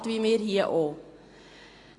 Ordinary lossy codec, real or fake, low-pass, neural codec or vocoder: AAC, 32 kbps; real; 10.8 kHz; none